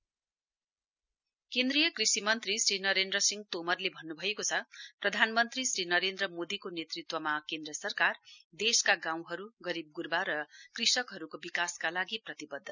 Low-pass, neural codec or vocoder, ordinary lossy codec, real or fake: 7.2 kHz; none; none; real